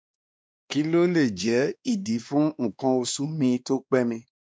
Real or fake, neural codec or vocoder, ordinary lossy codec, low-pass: fake; codec, 16 kHz, 2 kbps, X-Codec, WavLM features, trained on Multilingual LibriSpeech; none; none